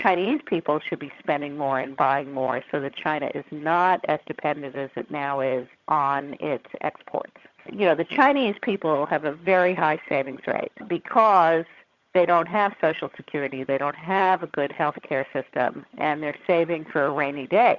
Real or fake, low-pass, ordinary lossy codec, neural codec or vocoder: fake; 7.2 kHz; Opus, 64 kbps; vocoder, 22.05 kHz, 80 mel bands, HiFi-GAN